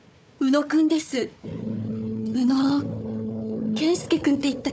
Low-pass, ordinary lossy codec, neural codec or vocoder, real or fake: none; none; codec, 16 kHz, 4 kbps, FunCodec, trained on Chinese and English, 50 frames a second; fake